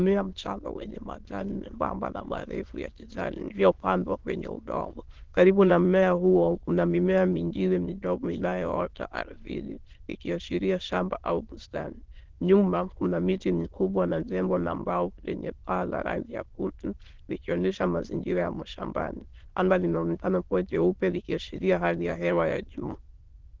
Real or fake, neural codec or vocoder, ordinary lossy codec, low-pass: fake; autoencoder, 22.05 kHz, a latent of 192 numbers a frame, VITS, trained on many speakers; Opus, 16 kbps; 7.2 kHz